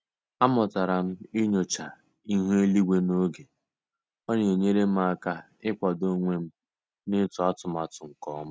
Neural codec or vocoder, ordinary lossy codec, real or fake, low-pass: none; none; real; none